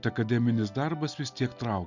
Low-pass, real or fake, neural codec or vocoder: 7.2 kHz; real; none